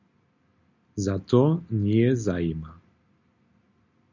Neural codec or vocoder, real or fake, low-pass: none; real; 7.2 kHz